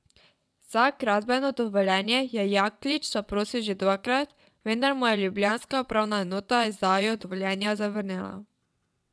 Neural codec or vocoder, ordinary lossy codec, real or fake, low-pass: vocoder, 22.05 kHz, 80 mel bands, WaveNeXt; none; fake; none